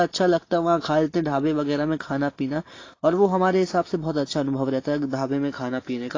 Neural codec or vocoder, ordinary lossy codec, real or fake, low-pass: none; AAC, 32 kbps; real; 7.2 kHz